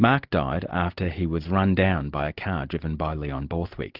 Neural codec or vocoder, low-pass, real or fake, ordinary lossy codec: none; 5.4 kHz; real; Opus, 32 kbps